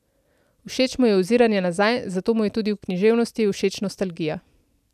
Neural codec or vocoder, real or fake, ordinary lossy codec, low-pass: none; real; none; 14.4 kHz